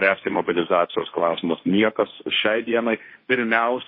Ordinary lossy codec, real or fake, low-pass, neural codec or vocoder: MP3, 24 kbps; fake; 5.4 kHz; codec, 16 kHz, 1.1 kbps, Voila-Tokenizer